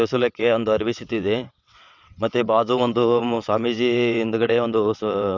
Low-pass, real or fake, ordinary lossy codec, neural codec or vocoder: 7.2 kHz; fake; none; vocoder, 22.05 kHz, 80 mel bands, WaveNeXt